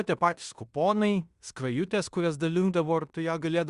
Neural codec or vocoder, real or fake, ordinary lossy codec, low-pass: codec, 16 kHz in and 24 kHz out, 0.9 kbps, LongCat-Audio-Codec, fine tuned four codebook decoder; fake; Opus, 64 kbps; 10.8 kHz